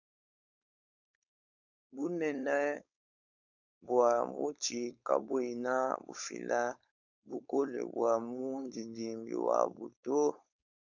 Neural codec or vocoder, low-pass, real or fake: codec, 16 kHz, 4.8 kbps, FACodec; 7.2 kHz; fake